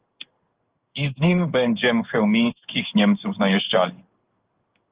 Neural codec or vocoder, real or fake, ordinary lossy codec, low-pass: codec, 16 kHz in and 24 kHz out, 1 kbps, XY-Tokenizer; fake; Opus, 32 kbps; 3.6 kHz